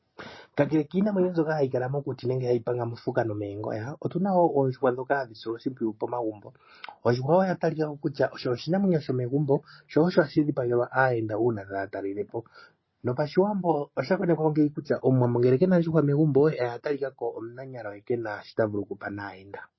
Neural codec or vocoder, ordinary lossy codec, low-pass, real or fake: none; MP3, 24 kbps; 7.2 kHz; real